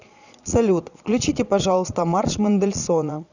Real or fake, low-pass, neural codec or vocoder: fake; 7.2 kHz; vocoder, 22.05 kHz, 80 mel bands, Vocos